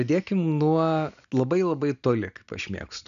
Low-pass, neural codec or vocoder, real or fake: 7.2 kHz; none; real